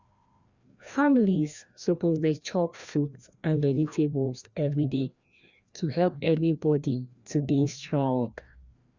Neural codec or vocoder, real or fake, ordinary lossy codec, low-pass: codec, 16 kHz, 1 kbps, FreqCodec, larger model; fake; Opus, 64 kbps; 7.2 kHz